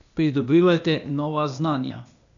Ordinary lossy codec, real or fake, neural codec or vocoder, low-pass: none; fake; codec, 16 kHz, 0.8 kbps, ZipCodec; 7.2 kHz